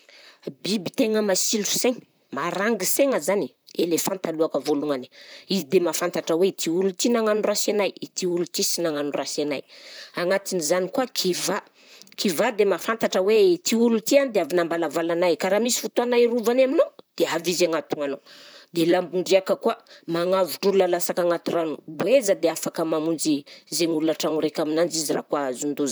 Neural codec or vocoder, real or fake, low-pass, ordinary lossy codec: vocoder, 44.1 kHz, 128 mel bands, Pupu-Vocoder; fake; none; none